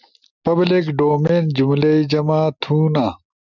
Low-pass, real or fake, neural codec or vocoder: 7.2 kHz; real; none